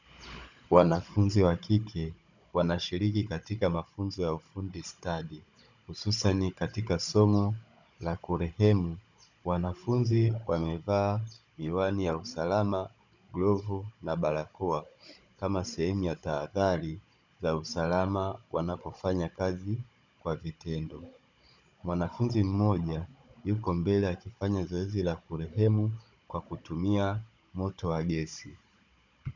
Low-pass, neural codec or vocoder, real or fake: 7.2 kHz; codec, 16 kHz, 16 kbps, FunCodec, trained on Chinese and English, 50 frames a second; fake